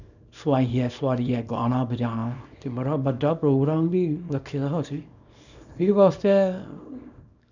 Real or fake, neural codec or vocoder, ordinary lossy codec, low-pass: fake; codec, 24 kHz, 0.9 kbps, WavTokenizer, small release; none; 7.2 kHz